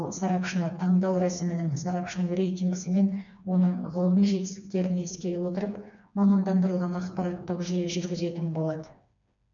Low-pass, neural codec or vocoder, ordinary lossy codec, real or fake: 7.2 kHz; codec, 16 kHz, 2 kbps, FreqCodec, smaller model; none; fake